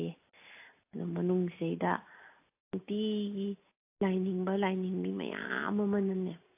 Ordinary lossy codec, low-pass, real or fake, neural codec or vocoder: AAC, 24 kbps; 3.6 kHz; real; none